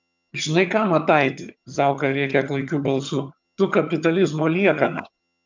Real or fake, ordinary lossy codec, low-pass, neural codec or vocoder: fake; MP3, 64 kbps; 7.2 kHz; vocoder, 22.05 kHz, 80 mel bands, HiFi-GAN